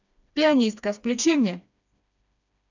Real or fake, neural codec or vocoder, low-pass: fake; codec, 16 kHz, 2 kbps, FreqCodec, smaller model; 7.2 kHz